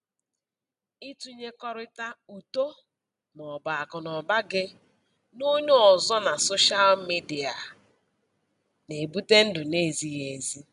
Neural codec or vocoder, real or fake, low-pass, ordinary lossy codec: none; real; 10.8 kHz; none